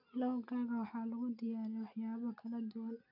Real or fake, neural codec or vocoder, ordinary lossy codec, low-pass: real; none; none; 5.4 kHz